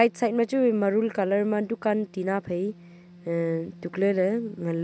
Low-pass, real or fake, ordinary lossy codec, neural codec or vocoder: none; real; none; none